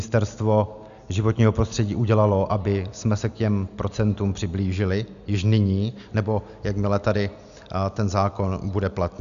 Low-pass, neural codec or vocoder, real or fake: 7.2 kHz; none; real